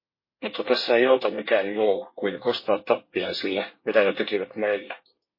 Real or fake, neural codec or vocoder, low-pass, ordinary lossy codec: fake; codec, 24 kHz, 1 kbps, SNAC; 5.4 kHz; MP3, 24 kbps